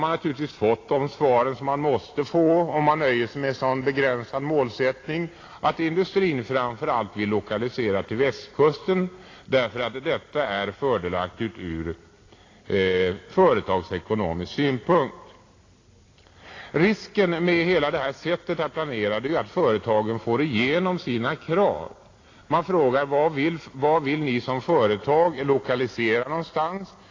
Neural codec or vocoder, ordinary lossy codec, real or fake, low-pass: none; AAC, 32 kbps; real; 7.2 kHz